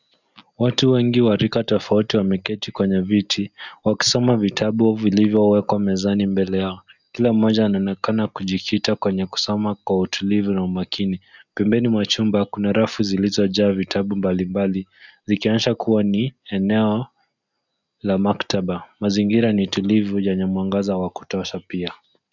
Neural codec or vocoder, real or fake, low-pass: none; real; 7.2 kHz